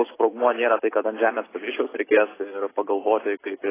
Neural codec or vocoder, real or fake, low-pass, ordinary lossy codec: none; real; 3.6 kHz; AAC, 16 kbps